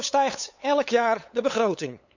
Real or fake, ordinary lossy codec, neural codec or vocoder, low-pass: fake; none; codec, 16 kHz, 8 kbps, FunCodec, trained on LibriTTS, 25 frames a second; 7.2 kHz